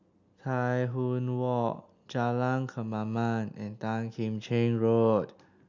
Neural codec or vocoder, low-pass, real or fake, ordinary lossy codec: none; 7.2 kHz; real; none